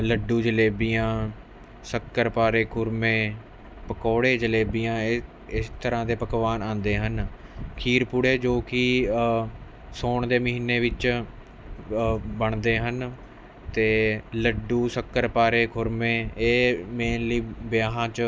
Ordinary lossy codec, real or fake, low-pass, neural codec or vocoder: none; real; none; none